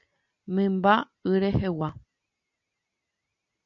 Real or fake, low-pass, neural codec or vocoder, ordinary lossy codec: real; 7.2 kHz; none; MP3, 64 kbps